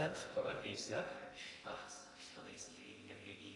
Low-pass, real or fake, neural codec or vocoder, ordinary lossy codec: 10.8 kHz; fake; codec, 16 kHz in and 24 kHz out, 0.6 kbps, FocalCodec, streaming, 2048 codes; AAC, 32 kbps